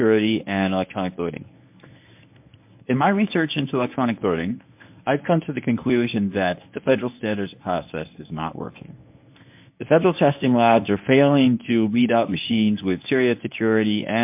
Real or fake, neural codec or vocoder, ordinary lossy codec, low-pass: fake; codec, 24 kHz, 0.9 kbps, WavTokenizer, medium speech release version 1; MP3, 32 kbps; 3.6 kHz